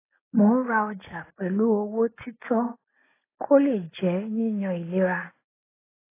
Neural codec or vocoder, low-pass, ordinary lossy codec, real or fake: none; 3.6 kHz; AAC, 16 kbps; real